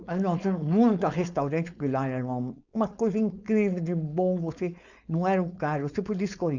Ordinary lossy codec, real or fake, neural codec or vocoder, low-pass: none; fake; codec, 16 kHz, 4.8 kbps, FACodec; 7.2 kHz